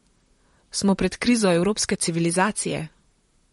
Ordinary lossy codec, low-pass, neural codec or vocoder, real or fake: MP3, 48 kbps; 19.8 kHz; vocoder, 44.1 kHz, 128 mel bands, Pupu-Vocoder; fake